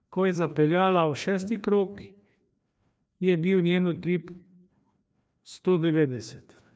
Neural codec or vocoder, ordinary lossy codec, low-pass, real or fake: codec, 16 kHz, 1 kbps, FreqCodec, larger model; none; none; fake